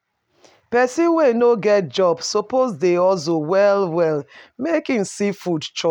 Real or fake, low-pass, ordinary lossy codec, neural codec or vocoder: real; 19.8 kHz; none; none